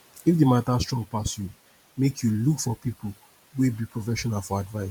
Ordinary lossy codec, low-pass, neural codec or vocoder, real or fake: MP3, 96 kbps; 19.8 kHz; vocoder, 44.1 kHz, 128 mel bands every 512 samples, BigVGAN v2; fake